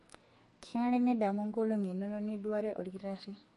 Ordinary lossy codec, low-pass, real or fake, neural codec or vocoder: MP3, 48 kbps; 14.4 kHz; fake; codec, 44.1 kHz, 2.6 kbps, SNAC